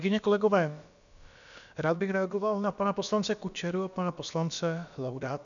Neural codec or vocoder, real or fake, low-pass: codec, 16 kHz, about 1 kbps, DyCAST, with the encoder's durations; fake; 7.2 kHz